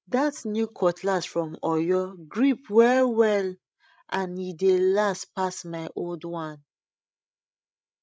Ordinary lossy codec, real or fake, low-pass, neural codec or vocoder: none; fake; none; codec, 16 kHz, 16 kbps, FreqCodec, larger model